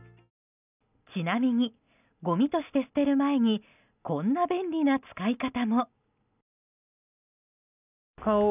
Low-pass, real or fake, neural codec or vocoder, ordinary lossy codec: 3.6 kHz; real; none; none